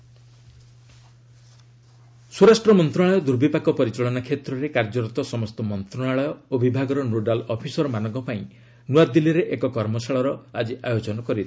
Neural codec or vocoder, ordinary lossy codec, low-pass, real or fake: none; none; none; real